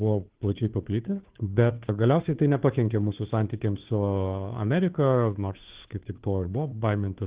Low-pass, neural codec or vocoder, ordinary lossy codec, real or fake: 3.6 kHz; codec, 16 kHz, 2 kbps, FunCodec, trained on Chinese and English, 25 frames a second; Opus, 16 kbps; fake